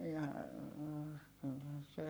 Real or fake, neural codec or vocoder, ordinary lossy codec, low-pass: fake; codec, 44.1 kHz, 7.8 kbps, Pupu-Codec; none; none